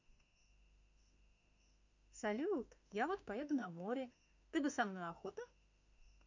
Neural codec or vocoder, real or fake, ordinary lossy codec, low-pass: autoencoder, 48 kHz, 32 numbers a frame, DAC-VAE, trained on Japanese speech; fake; none; 7.2 kHz